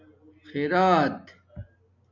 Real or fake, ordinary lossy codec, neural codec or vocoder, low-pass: real; MP3, 64 kbps; none; 7.2 kHz